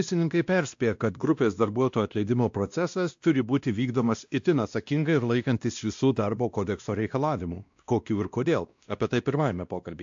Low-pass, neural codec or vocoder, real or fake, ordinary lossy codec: 7.2 kHz; codec, 16 kHz, 1 kbps, X-Codec, WavLM features, trained on Multilingual LibriSpeech; fake; AAC, 64 kbps